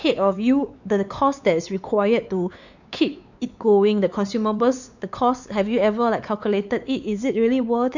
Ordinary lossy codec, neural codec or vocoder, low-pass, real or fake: none; codec, 16 kHz, 4 kbps, X-Codec, WavLM features, trained on Multilingual LibriSpeech; 7.2 kHz; fake